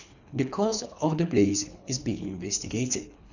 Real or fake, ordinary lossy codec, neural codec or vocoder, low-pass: fake; none; codec, 24 kHz, 3 kbps, HILCodec; 7.2 kHz